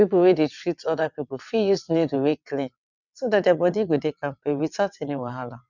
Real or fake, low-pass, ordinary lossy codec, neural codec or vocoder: fake; 7.2 kHz; none; vocoder, 22.05 kHz, 80 mel bands, WaveNeXt